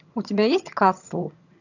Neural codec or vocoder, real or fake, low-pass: vocoder, 22.05 kHz, 80 mel bands, HiFi-GAN; fake; 7.2 kHz